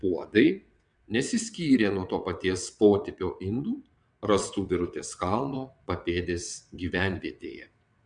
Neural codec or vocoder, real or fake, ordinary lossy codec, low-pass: vocoder, 22.05 kHz, 80 mel bands, WaveNeXt; fake; MP3, 96 kbps; 9.9 kHz